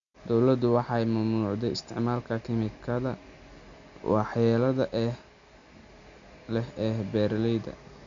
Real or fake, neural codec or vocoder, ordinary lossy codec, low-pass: real; none; MP3, 64 kbps; 7.2 kHz